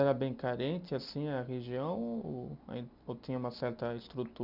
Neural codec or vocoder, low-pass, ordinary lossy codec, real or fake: none; 5.4 kHz; none; real